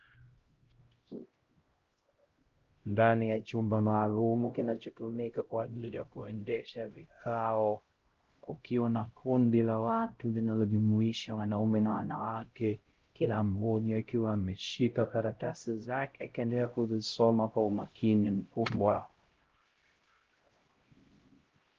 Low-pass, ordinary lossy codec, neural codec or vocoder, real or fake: 7.2 kHz; Opus, 16 kbps; codec, 16 kHz, 0.5 kbps, X-Codec, HuBERT features, trained on LibriSpeech; fake